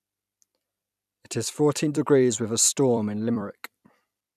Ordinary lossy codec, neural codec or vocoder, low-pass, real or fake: none; vocoder, 44.1 kHz, 128 mel bands every 256 samples, BigVGAN v2; 14.4 kHz; fake